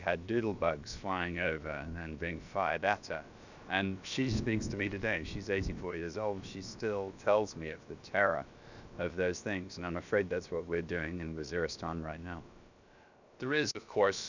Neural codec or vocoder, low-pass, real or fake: codec, 16 kHz, about 1 kbps, DyCAST, with the encoder's durations; 7.2 kHz; fake